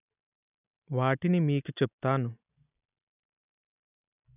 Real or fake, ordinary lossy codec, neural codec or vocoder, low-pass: real; none; none; 3.6 kHz